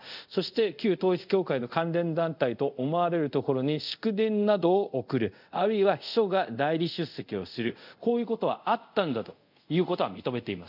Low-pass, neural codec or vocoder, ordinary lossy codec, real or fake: 5.4 kHz; codec, 24 kHz, 0.5 kbps, DualCodec; none; fake